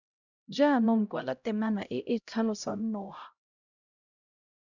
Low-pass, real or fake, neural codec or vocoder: 7.2 kHz; fake; codec, 16 kHz, 0.5 kbps, X-Codec, HuBERT features, trained on LibriSpeech